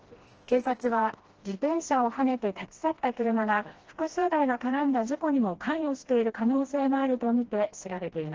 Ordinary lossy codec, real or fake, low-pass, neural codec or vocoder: Opus, 16 kbps; fake; 7.2 kHz; codec, 16 kHz, 1 kbps, FreqCodec, smaller model